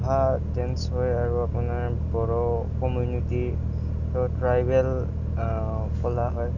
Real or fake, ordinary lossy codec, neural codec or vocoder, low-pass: real; none; none; 7.2 kHz